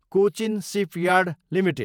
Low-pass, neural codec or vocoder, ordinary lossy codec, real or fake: 19.8 kHz; vocoder, 48 kHz, 128 mel bands, Vocos; none; fake